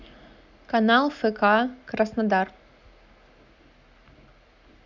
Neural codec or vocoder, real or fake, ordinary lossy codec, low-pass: none; real; none; 7.2 kHz